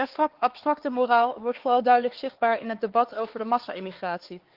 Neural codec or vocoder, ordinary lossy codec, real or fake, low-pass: codec, 16 kHz, 4 kbps, X-Codec, HuBERT features, trained on LibriSpeech; Opus, 16 kbps; fake; 5.4 kHz